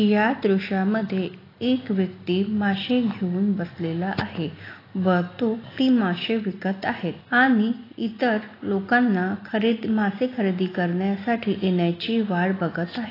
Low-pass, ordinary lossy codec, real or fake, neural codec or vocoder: 5.4 kHz; AAC, 24 kbps; real; none